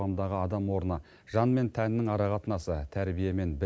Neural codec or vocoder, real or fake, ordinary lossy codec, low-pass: none; real; none; none